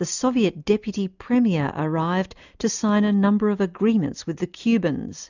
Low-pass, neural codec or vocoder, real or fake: 7.2 kHz; none; real